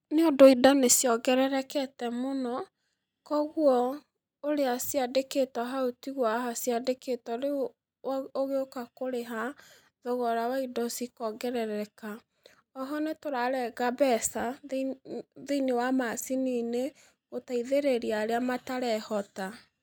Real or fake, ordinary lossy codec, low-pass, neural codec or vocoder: real; none; none; none